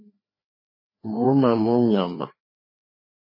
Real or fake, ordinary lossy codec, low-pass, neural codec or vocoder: fake; MP3, 24 kbps; 5.4 kHz; codec, 16 kHz, 2 kbps, FreqCodec, larger model